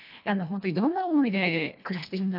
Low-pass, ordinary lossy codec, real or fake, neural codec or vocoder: 5.4 kHz; none; fake; codec, 24 kHz, 1.5 kbps, HILCodec